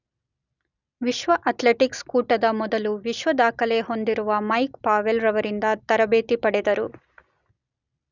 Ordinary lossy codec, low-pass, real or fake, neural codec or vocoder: none; 7.2 kHz; real; none